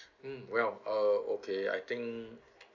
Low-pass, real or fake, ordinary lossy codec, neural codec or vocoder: 7.2 kHz; real; none; none